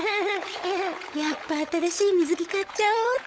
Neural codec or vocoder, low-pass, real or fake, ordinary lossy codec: codec, 16 kHz, 16 kbps, FunCodec, trained on LibriTTS, 50 frames a second; none; fake; none